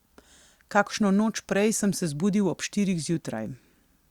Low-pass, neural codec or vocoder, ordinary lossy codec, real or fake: 19.8 kHz; none; Opus, 64 kbps; real